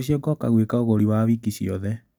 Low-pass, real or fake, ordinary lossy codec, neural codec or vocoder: none; real; none; none